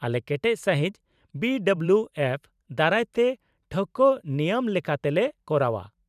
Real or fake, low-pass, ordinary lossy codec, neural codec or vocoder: fake; 14.4 kHz; none; vocoder, 44.1 kHz, 128 mel bands every 512 samples, BigVGAN v2